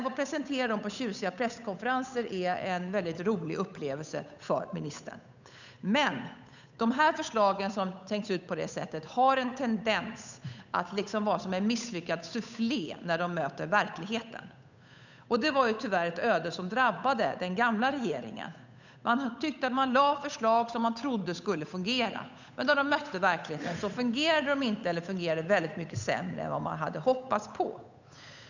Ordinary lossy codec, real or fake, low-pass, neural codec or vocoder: none; fake; 7.2 kHz; codec, 16 kHz, 8 kbps, FunCodec, trained on Chinese and English, 25 frames a second